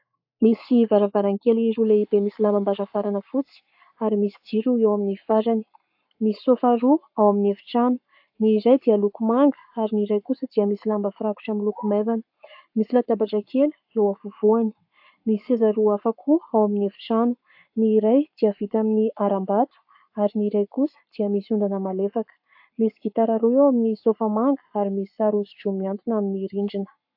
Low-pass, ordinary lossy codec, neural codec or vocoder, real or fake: 5.4 kHz; AAC, 48 kbps; codec, 44.1 kHz, 7.8 kbps, Pupu-Codec; fake